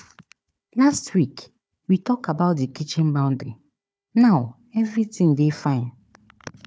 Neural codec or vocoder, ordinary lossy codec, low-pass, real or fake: codec, 16 kHz, 4 kbps, FunCodec, trained on Chinese and English, 50 frames a second; none; none; fake